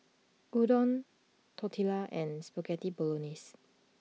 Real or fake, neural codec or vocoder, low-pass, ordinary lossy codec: real; none; none; none